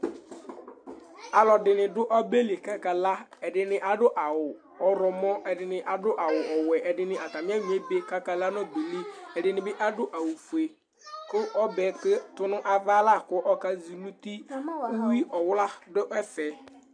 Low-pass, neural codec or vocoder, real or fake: 9.9 kHz; none; real